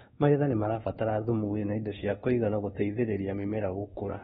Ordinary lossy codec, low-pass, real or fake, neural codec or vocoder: AAC, 16 kbps; 10.8 kHz; fake; codec, 24 kHz, 1.2 kbps, DualCodec